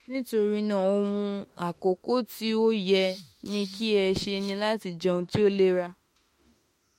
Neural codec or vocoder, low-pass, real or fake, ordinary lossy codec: autoencoder, 48 kHz, 32 numbers a frame, DAC-VAE, trained on Japanese speech; 19.8 kHz; fake; MP3, 64 kbps